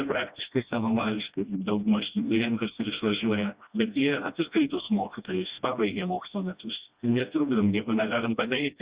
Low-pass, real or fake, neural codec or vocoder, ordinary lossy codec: 3.6 kHz; fake; codec, 16 kHz, 1 kbps, FreqCodec, smaller model; Opus, 64 kbps